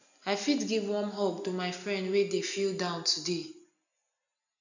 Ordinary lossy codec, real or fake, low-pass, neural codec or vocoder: none; real; 7.2 kHz; none